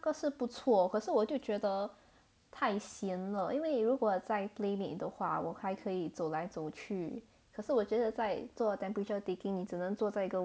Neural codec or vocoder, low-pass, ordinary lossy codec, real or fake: none; none; none; real